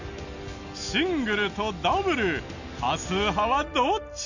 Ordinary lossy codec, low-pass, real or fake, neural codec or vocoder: none; 7.2 kHz; real; none